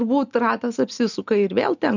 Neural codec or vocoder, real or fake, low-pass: none; real; 7.2 kHz